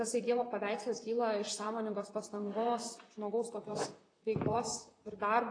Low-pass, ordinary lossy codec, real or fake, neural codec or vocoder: 9.9 kHz; AAC, 32 kbps; fake; vocoder, 44.1 kHz, 128 mel bands, Pupu-Vocoder